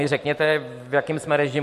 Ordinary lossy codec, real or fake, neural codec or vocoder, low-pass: MP3, 64 kbps; real; none; 14.4 kHz